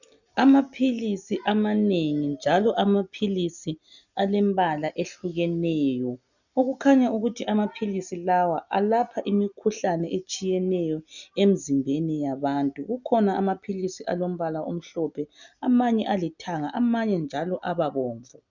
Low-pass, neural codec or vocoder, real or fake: 7.2 kHz; none; real